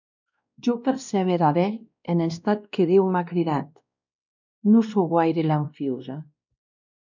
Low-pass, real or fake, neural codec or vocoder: 7.2 kHz; fake; codec, 16 kHz, 1 kbps, X-Codec, WavLM features, trained on Multilingual LibriSpeech